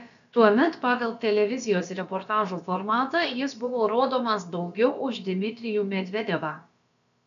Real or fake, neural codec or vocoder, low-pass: fake; codec, 16 kHz, about 1 kbps, DyCAST, with the encoder's durations; 7.2 kHz